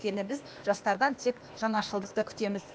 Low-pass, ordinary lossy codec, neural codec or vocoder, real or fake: none; none; codec, 16 kHz, 0.8 kbps, ZipCodec; fake